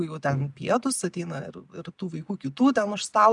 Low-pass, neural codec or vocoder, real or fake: 10.8 kHz; none; real